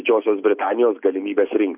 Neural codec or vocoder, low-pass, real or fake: none; 3.6 kHz; real